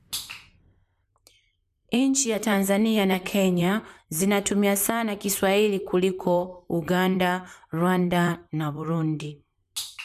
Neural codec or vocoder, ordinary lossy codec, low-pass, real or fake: vocoder, 44.1 kHz, 128 mel bands, Pupu-Vocoder; none; 14.4 kHz; fake